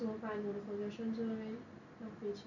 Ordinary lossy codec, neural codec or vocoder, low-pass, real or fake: none; none; 7.2 kHz; real